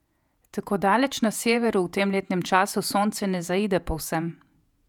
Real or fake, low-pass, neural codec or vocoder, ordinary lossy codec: fake; 19.8 kHz; vocoder, 48 kHz, 128 mel bands, Vocos; none